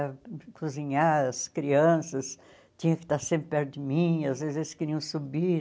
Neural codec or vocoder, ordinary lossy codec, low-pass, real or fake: none; none; none; real